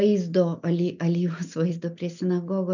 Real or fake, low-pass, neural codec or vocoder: real; 7.2 kHz; none